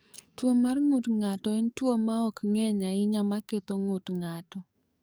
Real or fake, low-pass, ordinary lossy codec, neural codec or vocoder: fake; none; none; codec, 44.1 kHz, 7.8 kbps, DAC